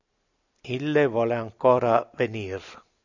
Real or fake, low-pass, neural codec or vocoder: real; 7.2 kHz; none